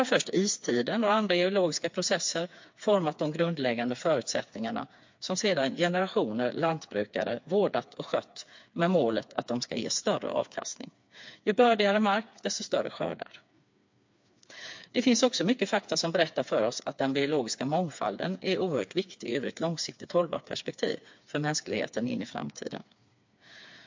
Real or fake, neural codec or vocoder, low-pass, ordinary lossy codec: fake; codec, 16 kHz, 4 kbps, FreqCodec, smaller model; 7.2 kHz; MP3, 48 kbps